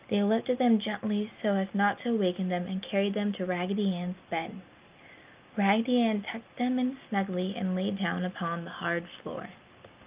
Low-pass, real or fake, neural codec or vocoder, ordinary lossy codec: 3.6 kHz; real; none; Opus, 24 kbps